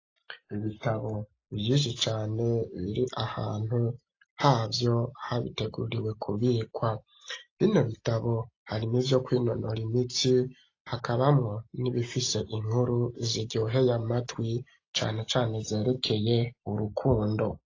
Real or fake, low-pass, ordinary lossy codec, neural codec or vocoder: real; 7.2 kHz; AAC, 32 kbps; none